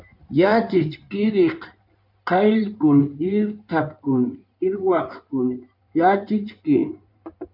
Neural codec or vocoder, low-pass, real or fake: codec, 16 kHz in and 24 kHz out, 2.2 kbps, FireRedTTS-2 codec; 5.4 kHz; fake